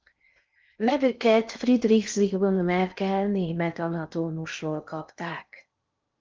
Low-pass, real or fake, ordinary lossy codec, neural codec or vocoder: 7.2 kHz; fake; Opus, 32 kbps; codec, 16 kHz in and 24 kHz out, 0.6 kbps, FocalCodec, streaming, 2048 codes